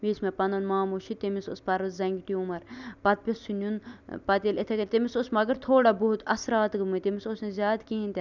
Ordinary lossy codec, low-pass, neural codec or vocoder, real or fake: none; 7.2 kHz; none; real